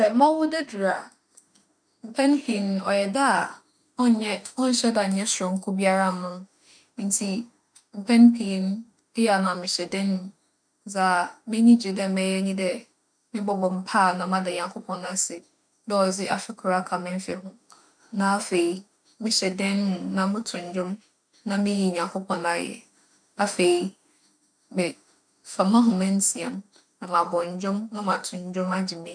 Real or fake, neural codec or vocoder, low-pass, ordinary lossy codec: fake; autoencoder, 48 kHz, 32 numbers a frame, DAC-VAE, trained on Japanese speech; 9.9 kHz; none